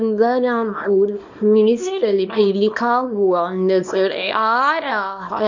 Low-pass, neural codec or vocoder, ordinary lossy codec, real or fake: 7.2 kHz; codec, 24 kHz, 0.9 kbps, WavTokenizer, small release; MP3, 64 kbps; fake